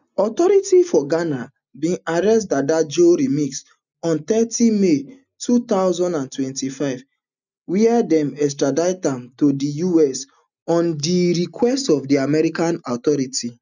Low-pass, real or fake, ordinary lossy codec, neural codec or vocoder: 7.2 kHz; real; none; none